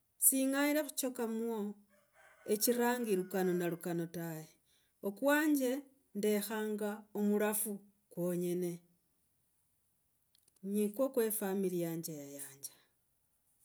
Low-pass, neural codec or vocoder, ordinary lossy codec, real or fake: none; none; none; real